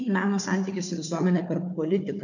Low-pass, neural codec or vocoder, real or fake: 7.2 kHz; codec, 16 kHz, 4 kbps, FunCodec, trained on Chinese and English, 50 frames a second; fake